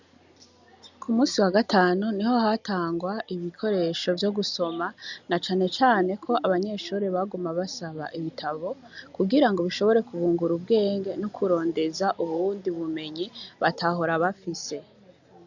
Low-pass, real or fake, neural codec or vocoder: 7.2 kHz; real; none